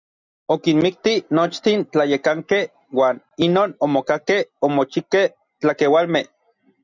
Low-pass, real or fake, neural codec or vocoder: 7.2 kHz; real; none